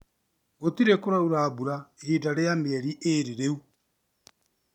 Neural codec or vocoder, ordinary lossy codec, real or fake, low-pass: none; none; real; 19.8 kHz